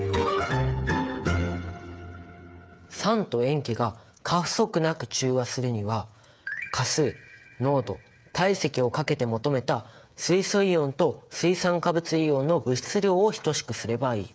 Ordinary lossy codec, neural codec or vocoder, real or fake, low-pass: none; codec, 16 kHz, 8 kbps, FreqCodec, smaller model; fake; none